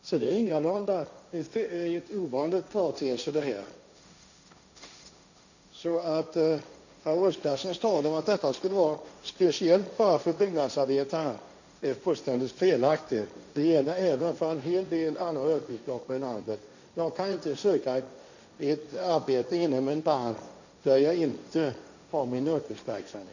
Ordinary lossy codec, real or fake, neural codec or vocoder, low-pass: none; fake; codec, 16 kHz, 1.1 kbps, Voila-Tokenizer; 7.2 kHz